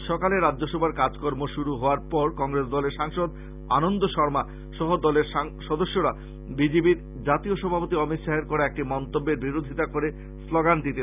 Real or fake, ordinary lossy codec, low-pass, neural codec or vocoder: real; none; 3.6 kHz; none